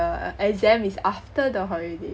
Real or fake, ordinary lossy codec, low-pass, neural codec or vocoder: real; none; none; none